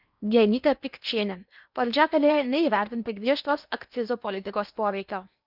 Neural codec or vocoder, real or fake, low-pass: codec, 16 kHz in and 24 kHz out, 0.8 kbps, FocalCodec, streaming, 65536 codes; fake; 5.4 kHz